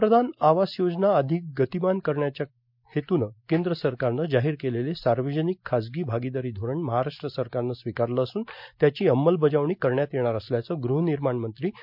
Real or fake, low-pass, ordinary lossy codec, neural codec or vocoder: real; 5.4 kHz; MP3, 48 kbps; none